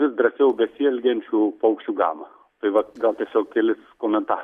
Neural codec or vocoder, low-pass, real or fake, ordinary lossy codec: none; 14.4 kHz; real; Opus, 64 kbps